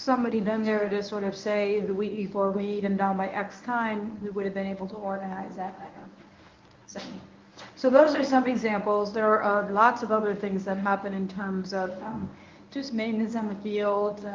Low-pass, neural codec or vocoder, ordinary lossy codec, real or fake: 7.2 kHz; codec, 24 kHz, 0.9 kbps, WavTokenizer, medium speech release version 1; Opus, 32 kbps; fake